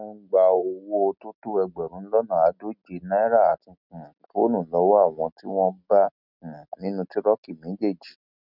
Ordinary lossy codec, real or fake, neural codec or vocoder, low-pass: none; real; none; 5.4 kHz